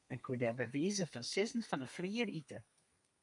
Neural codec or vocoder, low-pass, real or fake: codec, 32 kHz, 1.9 kbps, SNAC; 10.8 kHz; fake